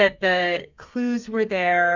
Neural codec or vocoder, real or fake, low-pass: codec, 32 kHz, 1.9 kbps, SNAC; fake; 7.2 kHz